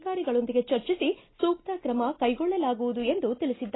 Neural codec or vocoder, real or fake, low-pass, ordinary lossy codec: none; real; 7.2 kHz; AAC, 16 kbps